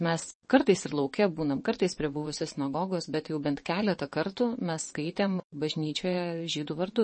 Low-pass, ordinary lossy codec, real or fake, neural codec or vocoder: 9.9 kHz; MP3, 32 kbps; fake; vocoder, 22.05 kHz, 80 mel bands, WaveNeXt